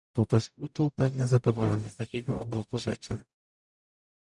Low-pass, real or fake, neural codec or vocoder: 10.8 kHz; fake; codec, 44.1 kHz, 0.9 kbps, DAC